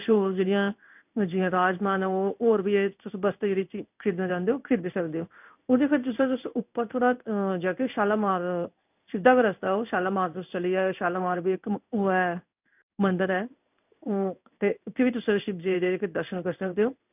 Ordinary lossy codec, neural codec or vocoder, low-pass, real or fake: none; codec, 16 kHz in and 24 kHz out, 1 kbps, XY-Tokenizer; 3.6 kHz; fake